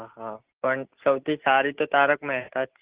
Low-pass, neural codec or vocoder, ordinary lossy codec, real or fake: 3.6 kHz; none; Opus, 16 kbps; real